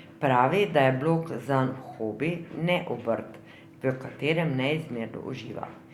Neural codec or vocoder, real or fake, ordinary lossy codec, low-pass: none; real; Opus, 64 kbps; 19.8 kHz